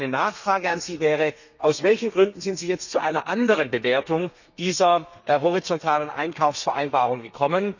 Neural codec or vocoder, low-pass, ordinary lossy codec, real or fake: codec, 32 kHz, 1.9 kbps, SNAC; 7.2 kHz; none; fake